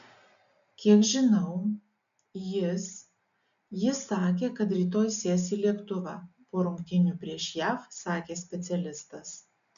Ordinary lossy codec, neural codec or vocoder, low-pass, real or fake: MP3, 96 kbps; none; 7.2 kHz; real